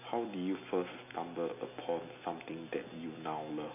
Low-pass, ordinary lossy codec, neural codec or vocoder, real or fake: 3.6 kHz; none; none; real